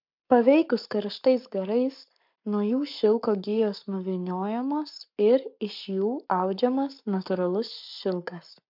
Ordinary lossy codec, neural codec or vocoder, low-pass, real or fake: MP3, 48 kbps; codec, 44.1 kHz, 7.8 kbps, Pupu-Codec; 5.4 kHz; fake